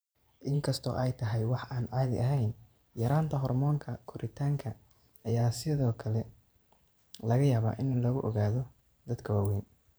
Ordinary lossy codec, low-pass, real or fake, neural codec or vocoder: none; none; fake; vocoder, 44.1 kHz, 128 mel bands every 512 samples, BigVGAN v2